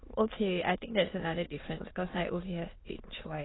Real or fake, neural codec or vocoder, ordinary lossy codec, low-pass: fake; autoencoder, 22.05 kHz, a latent of 192 numbers a frame, VITS, trained on many speakers; AAC, 16 kbps; 7.2 kHz